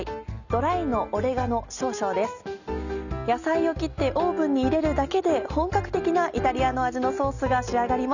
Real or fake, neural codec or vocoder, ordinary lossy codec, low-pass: real; none; none; 7.2 kHz